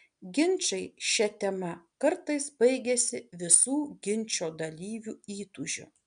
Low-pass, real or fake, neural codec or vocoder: 9.9 kHz; real; none